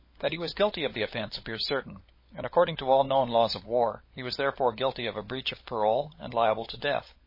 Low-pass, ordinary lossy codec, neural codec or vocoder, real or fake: 5.4 kHz; MP3, 24 kbps; codec, 16 kHz, 16 kbps, FunCodec, trained on LibriTTS, 50 frames a second; fake